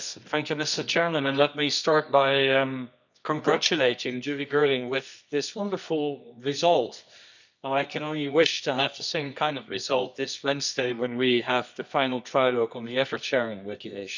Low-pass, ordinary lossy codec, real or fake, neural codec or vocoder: 7.2 kHz; none; fake; codec, 24 kHz, 0.9 kbps, WavTokenizer, medium music audio release